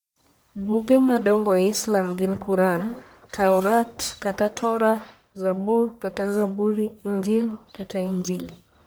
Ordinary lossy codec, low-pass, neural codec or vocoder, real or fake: none; none; codec, 44.1 kHz, 1.7 kbps, Pupu-Codec; fake